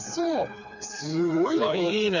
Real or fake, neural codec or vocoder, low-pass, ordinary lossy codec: fake; codec, 16 kHz, 4 kbps, FreqCodec, smaller model; 7.2 kHz; none